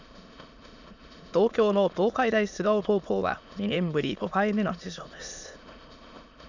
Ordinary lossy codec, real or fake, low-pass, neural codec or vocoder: none; fake; 7.2 kHz; autoencoder, 22.05 kHz, a latent of 192 numbers a frame, VITS, trained on many speakers